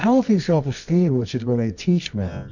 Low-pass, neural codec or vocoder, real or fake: 7.2 kHz; codec, 24 kHz, 0.9 kbps, WavTokenizer, medium music audio release; fake